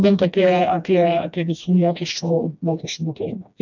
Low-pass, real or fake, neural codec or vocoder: 7.2 kHz; fake; codec, 16 kHz, 1 kbps, FreqCodec, smaller model